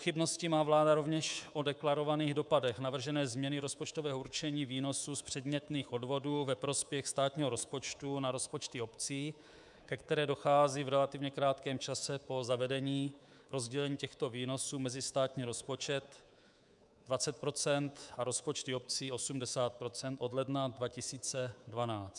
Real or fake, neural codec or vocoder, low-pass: fake; codec, 24 kHz, 3.1 kbps, DualCodec; 10.8 kHz